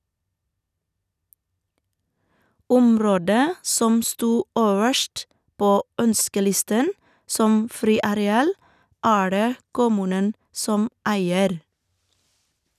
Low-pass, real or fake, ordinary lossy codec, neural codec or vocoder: 14.4 kHz; real; none; none